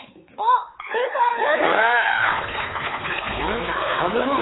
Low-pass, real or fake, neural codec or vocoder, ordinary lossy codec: 7.2 kHz; fake; codec, 16 kHz, 4 kbps, X-Codec, WavLM features, trained on Multilingual LibriSpeech; AAC, 16 kbps